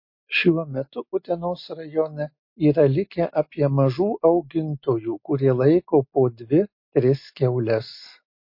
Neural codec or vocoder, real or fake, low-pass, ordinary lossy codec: none; real; 5.4 kHz; MP3, 32 kbps